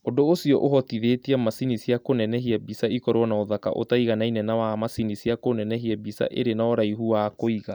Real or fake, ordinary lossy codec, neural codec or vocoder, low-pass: real; none; none; none